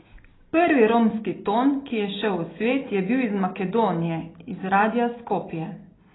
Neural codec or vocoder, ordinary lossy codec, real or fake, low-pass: none; AAC, 16 kbps; real; 7.2 kHz